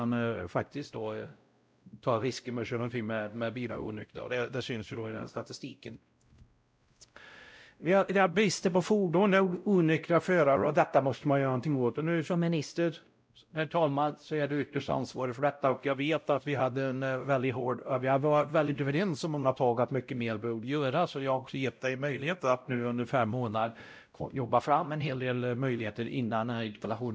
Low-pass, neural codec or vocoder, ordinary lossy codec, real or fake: none; codec, 16 kHz, 0.5 kbps, X-Codec, WavLM features, trained on Multilingual LibriSpeech; none; fake